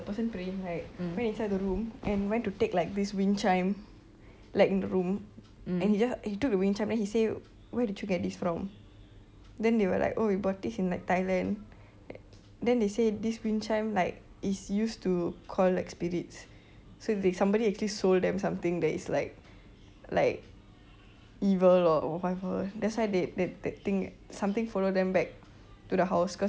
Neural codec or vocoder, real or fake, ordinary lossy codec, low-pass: none; real; none; none